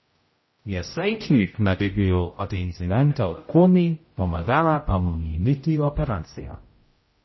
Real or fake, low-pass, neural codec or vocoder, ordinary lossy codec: fake; 7.2 kHz; codec, 16 kHz, 0.5 kbps, X-Codec, HuBERT features, trained on general audio; MP3, 24 kbps